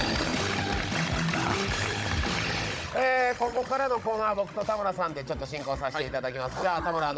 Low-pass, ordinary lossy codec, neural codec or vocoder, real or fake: none; none; codec, 16 kHz, 16 kbps, FunCodec, trained on Chinese and English, 50 frames a second; fake